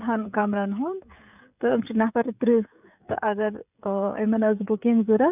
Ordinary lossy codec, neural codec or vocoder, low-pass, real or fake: none; codec, 16 kHz, 16 kbps, FreqCodec, smaller model; 3.6 kHz; fake